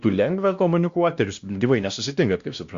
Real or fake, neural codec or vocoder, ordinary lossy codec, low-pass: fake; codec, 16 kHz, 1 kbps, X-Codec, WavLM features, trained on Multilingual LibriSpeech; Opus, 64 kbps; 7.2 kHz